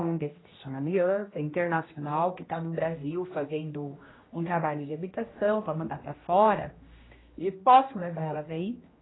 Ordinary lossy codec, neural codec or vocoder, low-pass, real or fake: AAC, 16 kbps; codec, 16 kHz, 1 kbps, X-Codec, HuBERT features, trained on general audio; 7.2 kHz; fake